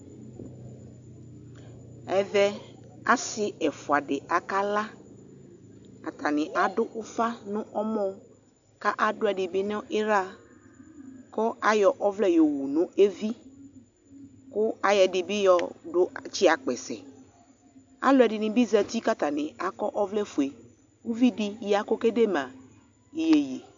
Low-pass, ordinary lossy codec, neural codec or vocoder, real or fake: 7.2 kHz; AAC, 64 kbps; none; real